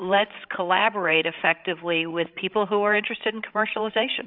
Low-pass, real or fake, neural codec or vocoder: 5.4 kHz; fake; codec, 16 kHz, 16 kbps, FreqCodec, larger model